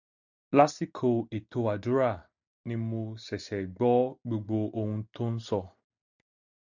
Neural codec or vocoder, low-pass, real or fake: none; 7.2 kHz; real